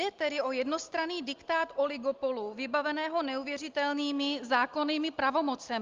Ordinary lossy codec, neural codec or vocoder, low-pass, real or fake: Opus, 32 kbps; none; 7.2 kHz; real